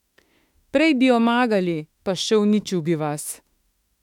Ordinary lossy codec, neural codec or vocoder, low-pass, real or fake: none; autoencoder, 48 kHz, 32 numbers a frame, DAC-VAE, trained on Japanese speech; 19.8 kHz; fake